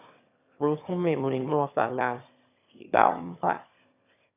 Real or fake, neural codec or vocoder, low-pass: fake; autoencoder, 22.05 kHz, a latent of 192 numbers a frame, VITS, trained on one speaker; 3.6 kHz